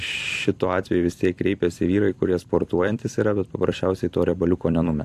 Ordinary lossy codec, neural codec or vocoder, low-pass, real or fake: AAC, 64 kbps; vocoder, 44.1 kHz, 128 mel bands every 256 samples, BigVGAN v2; 14.4 kHz; fake